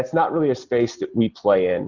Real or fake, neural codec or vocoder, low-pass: real; none; 7.2 kHz